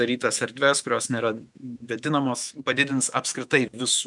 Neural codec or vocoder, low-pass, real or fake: autoencoder, 48 kHz, 128 numbers a frame, DAC-VAE, trained on Japanese speech; 10.8 kHz; fake